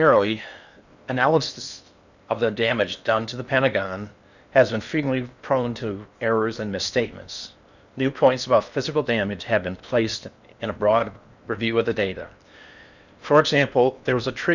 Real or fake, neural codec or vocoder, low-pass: fake; codec, 16 kHz in and 24 kHz out, 0.8 kbps, FocalCodec, streaming, 65536 codes; 7.2 kHz